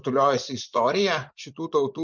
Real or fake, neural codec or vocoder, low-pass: fake; vocoder, 24 kHz, 100 mel bands, Vocos; 7.2 kHz